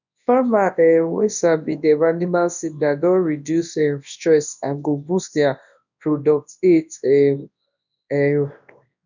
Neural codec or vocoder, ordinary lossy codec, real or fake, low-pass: codec, 24 kHz, 0.9 kbps, WavTokenizer, large speech release; none; fake; 7.2 kHz